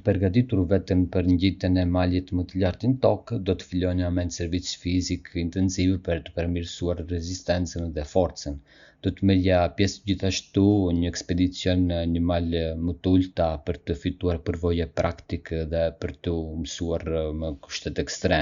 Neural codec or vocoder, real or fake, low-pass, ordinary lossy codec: none; real; 7.2 kHz; Opus, 64 kbps